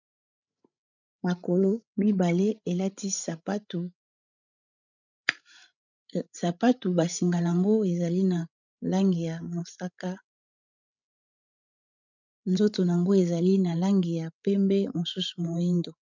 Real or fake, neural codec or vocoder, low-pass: fake; codec, 16 kHz, 16 kbps, FreqCodec, larger model; 7.2 kHz